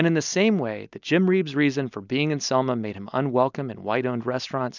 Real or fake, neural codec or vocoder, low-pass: real; none; 7.2 kHz